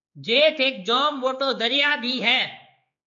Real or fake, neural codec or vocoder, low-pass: fake; codec, 16 kHz, 4 kbps, X-Codec, HuBERT features, trained on general audio; 7.2 kHz